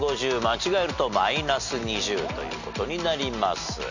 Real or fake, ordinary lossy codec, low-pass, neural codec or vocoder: real; none; 7.2 kHz; none